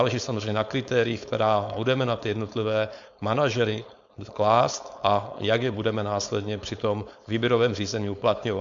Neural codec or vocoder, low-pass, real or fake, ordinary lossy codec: codec, 16 kHz, 4.8 kbps, FACodec; 7.2 kHz; fake; AAC, 64 kbps